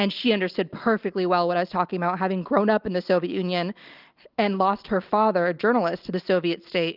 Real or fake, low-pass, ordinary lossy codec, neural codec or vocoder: real; 5.4 kHz; Opus, 32 kbps; none